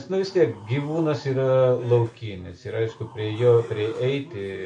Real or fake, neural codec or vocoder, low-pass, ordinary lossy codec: real; none; 10.8 kHz; MP3, 48 kbps